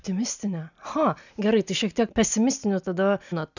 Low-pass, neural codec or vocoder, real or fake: 7.2 kHz; none; real